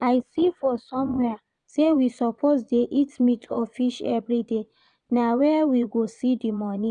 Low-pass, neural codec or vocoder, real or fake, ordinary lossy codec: 9.9 kHz; vocoder, 22.05 kHz, 80 mel bands, Vocos; fake; none